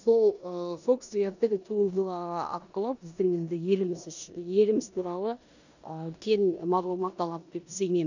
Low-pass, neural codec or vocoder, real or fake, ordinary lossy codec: 7.2 kHz; codec, 16 kHz in and 24 kHz out, 0.9 kbps, LongCat-Audio-Codec, four codebook decoder; fake; none